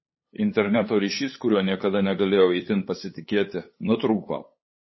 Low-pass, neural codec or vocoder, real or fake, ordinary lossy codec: 7.2 kHz; codec, 16 kHz, 8 kbps, FunCodec, trained on LibriTTS, 25 frames a second; fake; MP3, 24 kbps